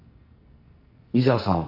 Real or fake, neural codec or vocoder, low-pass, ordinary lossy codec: fake; codec, 44.1 kHz, 2.6 kbps, SNAC; 5.4 kHz; none